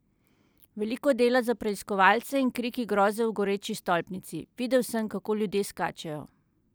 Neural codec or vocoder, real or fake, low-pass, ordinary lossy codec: none; real; none; none